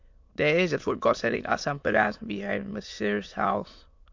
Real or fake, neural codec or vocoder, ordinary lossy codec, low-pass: fake; autoencoder, 22.05 kHz, a latent of 192 numbers a frame, VITS, trained on many speakers; MP3, 48 kbps; 7.2 kHz